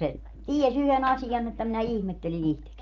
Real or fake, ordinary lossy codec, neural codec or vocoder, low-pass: real; Opus, 24 kbps; none; 7.2 kHz